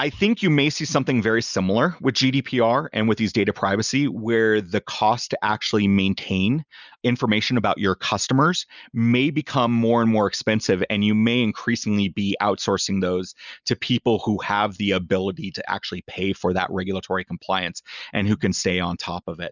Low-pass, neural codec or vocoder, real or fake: 7.2 kHz; none; real